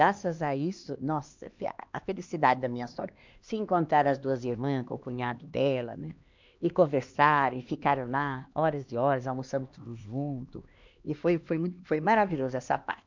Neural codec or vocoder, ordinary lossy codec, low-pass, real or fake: codec, 16 kHz, 2 kbps, X-Codec, HuBERT features, trained on LibriSpeech; MP3, 64 kbps; 7.2 kHz; fake